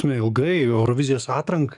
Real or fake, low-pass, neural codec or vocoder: fake; 10.8 kHz; codec, 44.1 kHz, 7.8 kbps, DAC